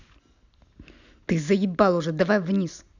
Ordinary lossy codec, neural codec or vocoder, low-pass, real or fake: none; none; 7.2 kHz; real